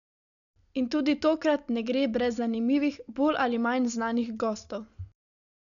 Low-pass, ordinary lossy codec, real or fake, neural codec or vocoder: 7.2 kHz; none; real; none